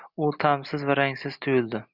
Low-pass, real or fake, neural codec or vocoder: 5.4 kHz; real; none